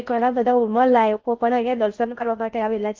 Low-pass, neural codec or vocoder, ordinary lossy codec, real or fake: 7.2 kHz; codec, 16 kHz in and 24 kHz out, 0.6 kbps, FocalCodec, streaming, 4096 codes; Opus, 24 kbps; fake